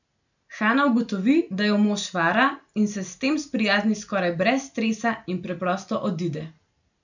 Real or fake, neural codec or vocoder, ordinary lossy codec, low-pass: real; none; none; 7.2 kHz